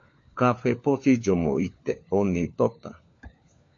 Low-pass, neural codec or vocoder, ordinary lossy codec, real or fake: 7.2 kHz; codec, 16 kHz, 4 kbps, FunCodec, trained on LibriTTS, 50 frames a second; AAC, 48 kbps; fake